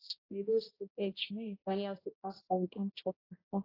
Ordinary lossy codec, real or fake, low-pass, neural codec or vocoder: AAC, 24 kbps; fake; 5.4 kHz; codec, 16 kHz, 0.5 kbps, X-Codec, HuBERT features, trained on general audio